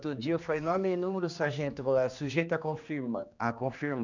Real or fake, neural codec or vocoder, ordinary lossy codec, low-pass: fake; codec, 16 kHz, 2 kbps, X-Codec, HuBERT features, trained on general audio; none; 7.2 kHz